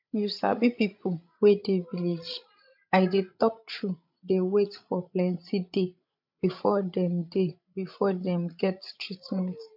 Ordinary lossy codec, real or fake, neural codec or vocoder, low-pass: MP3, 48 kbps; fake; codec, 16 kHz, 16 kbps, FreqCodec, larger model; 5.4 kHz